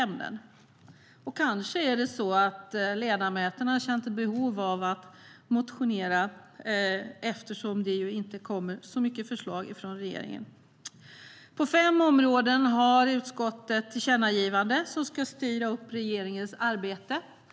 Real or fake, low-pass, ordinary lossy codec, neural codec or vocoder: real; none; none; none